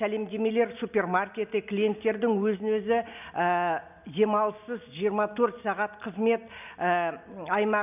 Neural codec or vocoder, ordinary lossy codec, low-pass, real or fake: none; none; 3.6 kHz; real